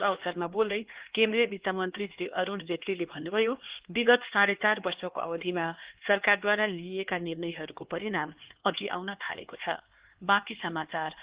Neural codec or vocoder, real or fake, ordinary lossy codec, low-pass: codec, 16 kHz, 2 kbps, X-Codec, HuBERT features, trained on LibriSpeech; fake; Opus, 16 kbps; 3.6 kHz